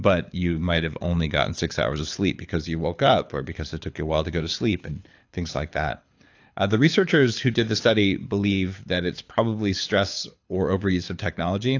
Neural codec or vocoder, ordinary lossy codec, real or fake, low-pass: codec, 24 kHz, 6 kbps, HILCodec; AAC, 48 kbps; fake; 7.2 kHz